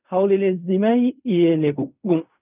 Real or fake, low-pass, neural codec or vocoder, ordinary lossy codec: fake; 3.6 kHz; codec, 16 kHz in and 24 kHz out, 0.4 kbps, LongCat-Audio-Codec, fine tuned four codebook decoder; none